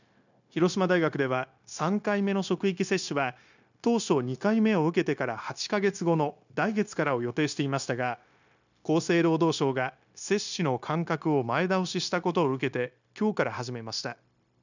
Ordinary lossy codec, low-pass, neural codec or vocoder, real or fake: none; 7.2 kHz; codec, 16 kHz, 0.9 kbps, LongCat-Audio-Codec; fake